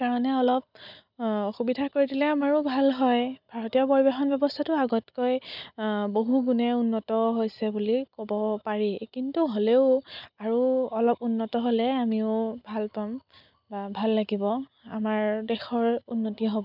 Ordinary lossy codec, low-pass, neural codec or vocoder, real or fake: none; 5.4 kHz; none; real